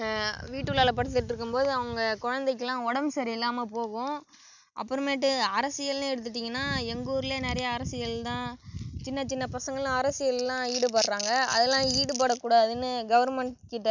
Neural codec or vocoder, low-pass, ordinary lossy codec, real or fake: none; 7.2 kHz; none; real